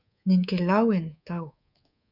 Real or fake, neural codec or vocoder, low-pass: fake; codec, 24 kHz, 3.1 kbps, DualCodec; 5.4 kHz